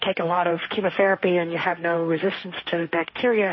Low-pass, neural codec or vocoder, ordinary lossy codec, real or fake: 7.2 kHz; codec, 16 kHz, 1.1 kbps, Voila-Tokenizer; MP3, 24 kbps; fake